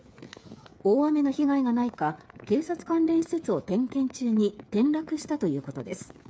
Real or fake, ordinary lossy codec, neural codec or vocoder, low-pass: fake; none; codec, 16 kHz, 8 kbps, FreqCodec, smaller model; none